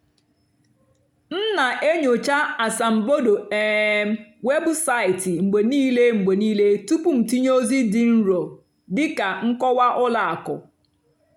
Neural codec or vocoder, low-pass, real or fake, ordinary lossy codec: none; 19.8 kHz; real; none